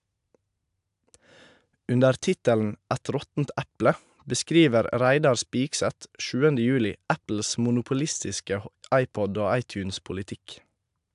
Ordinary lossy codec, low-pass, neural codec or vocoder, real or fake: AAC, 96 kbps; 10.8 kHz; none; real